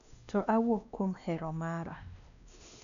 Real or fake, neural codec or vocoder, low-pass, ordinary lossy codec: fake; codec, 16 kHz, 2 kbps, X-Codec, WavLM features, trained on Multilingual LibriSpeech; 7.2 kHz; none